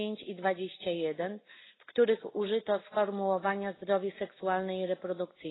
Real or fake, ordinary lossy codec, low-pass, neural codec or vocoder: real; AAC, 16 kbps; 7.2 kHz; none